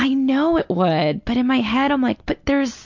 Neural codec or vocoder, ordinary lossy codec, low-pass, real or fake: none; MP3, 64 kbps; 7.2 kHz; real